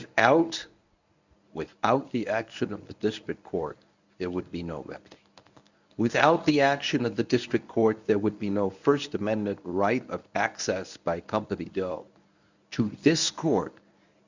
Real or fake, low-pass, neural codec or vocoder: fake; 7.2 kHz; codec, 24 kHz, 0.9 kbps, WavTokenizer, medium speech release version 1